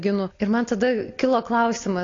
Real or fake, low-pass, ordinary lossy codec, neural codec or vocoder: real; 7.2 kHz; AAC, 32 kbps; none